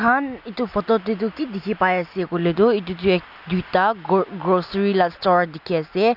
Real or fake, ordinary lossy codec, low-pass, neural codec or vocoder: real; none; 5.4 kHz; none